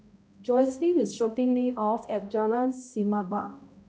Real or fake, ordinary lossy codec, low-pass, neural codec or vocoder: fake; none; none; codec, 16 kHz, 0.5 kbps, X-Codec, HuBERT features, trained on balanced general audio